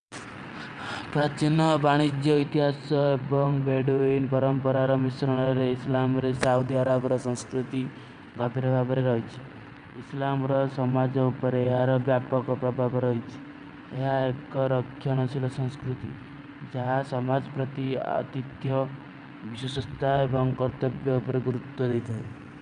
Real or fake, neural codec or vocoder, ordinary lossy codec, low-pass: fake; vocoder, 22.05 kHz, 80 mel bands, WaveNeXt; none; 9.9 kHz